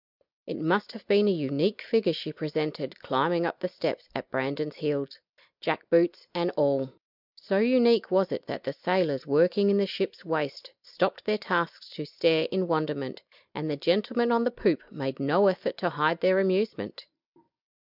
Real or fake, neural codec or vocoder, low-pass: real; none; 5.4 kHz